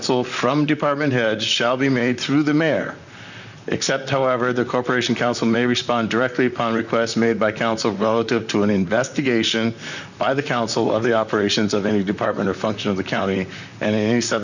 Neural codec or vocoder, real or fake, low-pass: vocoder, 44.1 kHz, 128 mel bands, Pupu-Vocoder; fake; 7.2 kHz